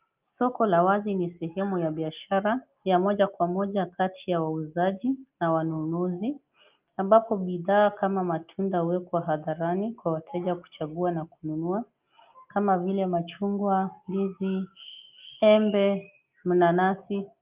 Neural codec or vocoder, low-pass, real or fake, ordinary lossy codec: none; 3.6 kHz; real; Opus, 24 kbps